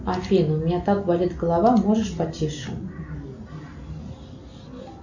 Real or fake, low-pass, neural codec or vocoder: real; 7.2 kHz; none